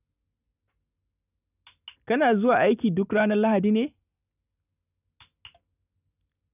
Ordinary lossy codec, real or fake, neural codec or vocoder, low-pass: none; real; none; 3.6 kHz